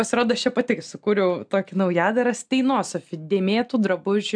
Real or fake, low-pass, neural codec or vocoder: real; 9.9 kHz; none